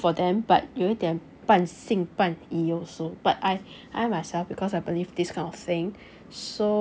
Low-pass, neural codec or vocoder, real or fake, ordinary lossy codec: none; none; real; none